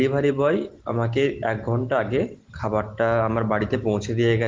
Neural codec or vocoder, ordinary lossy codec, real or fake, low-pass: none; Opus, 16 kbps; real; 7.2 kHz